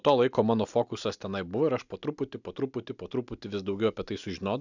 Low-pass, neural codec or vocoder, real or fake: 7.2 kHz; none; real